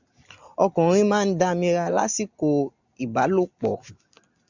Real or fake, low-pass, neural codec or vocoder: real; 7.2 kHz; none